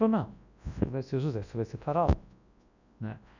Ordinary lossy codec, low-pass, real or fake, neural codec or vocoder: none; 7.2 kHz; fake; codec, 24 kHz, 0.9 kbps, WavTokenizer, large speech release